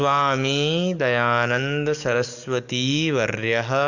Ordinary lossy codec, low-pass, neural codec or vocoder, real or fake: none; 7.2 kHz; codec, 44.1 kHz, 7.8 kbps, DAC; fake